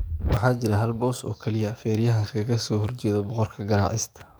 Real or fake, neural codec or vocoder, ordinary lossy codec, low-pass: fake; codec, 44.1 kHz, 7.8 kbps, DAC; none; none